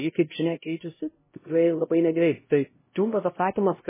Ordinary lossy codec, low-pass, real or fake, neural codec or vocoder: MP3, 16 kbps; 3.6 kHz; fake; codec, 16 kHz, 0.5 kbps, X-Codec, HuBERT features, trained on LibriSpeech